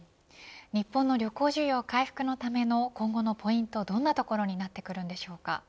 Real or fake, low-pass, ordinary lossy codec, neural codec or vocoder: real; none; none; none